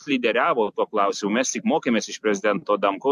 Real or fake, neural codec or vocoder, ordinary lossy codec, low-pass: real; none; AAC, 96 kbps; 14.4 kHz